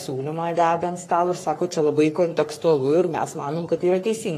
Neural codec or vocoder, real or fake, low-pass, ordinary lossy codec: codec, 44.1 kHz, 3.4 kbps, Pupu-Codec; fake; 14.4 kHz; AAC, 48 kbps